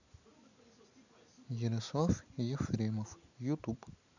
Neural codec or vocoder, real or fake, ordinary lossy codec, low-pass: none; real; none; 7.2 kHz